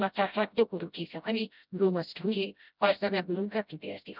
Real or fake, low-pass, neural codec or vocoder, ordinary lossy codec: fake; 5.4 kHz; codec, 16 kHz, 0.5 kbps, FreqCodec, smaller model; none